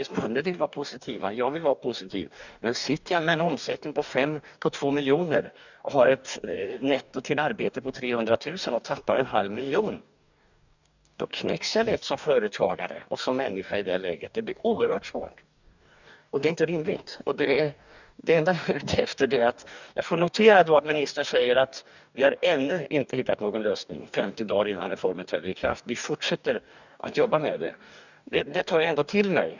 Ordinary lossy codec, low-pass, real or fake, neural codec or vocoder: none; 7.2 kHz; fake; codec, 44.1 kHz, 2.6 kbps, DAC